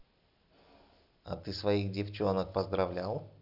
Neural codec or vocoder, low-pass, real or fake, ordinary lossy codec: none; 5.4 kHz; real; none